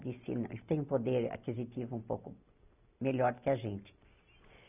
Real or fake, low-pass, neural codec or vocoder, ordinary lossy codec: real; 3.6 kHz; none; none